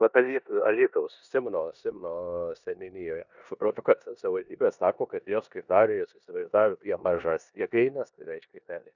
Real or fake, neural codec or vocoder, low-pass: fake; codec, 16 kHz in and 24 kHz out, 0.9 kbps, LongCat-Audio-Codec, four codebook decoder; 7.2 kHz